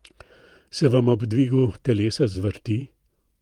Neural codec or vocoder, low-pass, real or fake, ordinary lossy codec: vocoder, 48 kHz, 128 mel bands, Vocos; 19.8 kHz; fake; Opus, 32 kbps